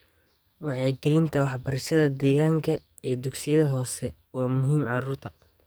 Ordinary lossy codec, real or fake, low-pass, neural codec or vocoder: none; fake; none; codec, 44.1 kHz, 2.6 kbps, SNAC